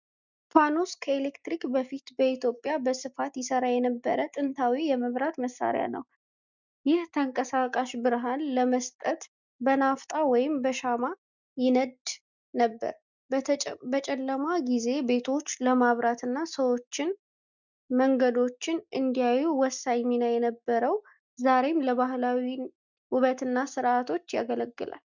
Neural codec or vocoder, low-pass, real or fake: none; 7.2 kHz; real